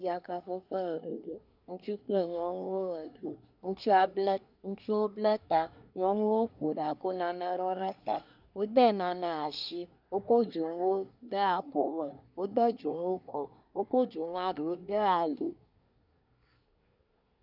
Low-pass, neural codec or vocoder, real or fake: 5.4 kHz; codec, 24 kHz, 1 kbps, SNAC; fake